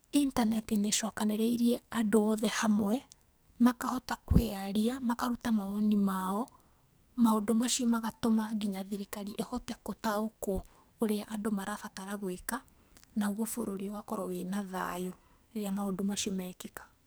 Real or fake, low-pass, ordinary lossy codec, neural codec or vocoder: fake; none; none; codec, 44.1 kHz, 2.6 kbps, SNAC